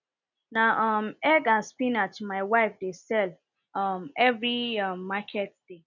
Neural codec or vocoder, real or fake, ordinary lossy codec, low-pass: none; real; none; 7.2 kHz